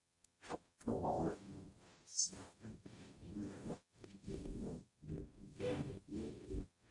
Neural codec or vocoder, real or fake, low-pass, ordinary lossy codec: codec, 44.1 kHz, 0.9 kbps, DAC; fake; 10.8 kHz; AAC, 48 kbps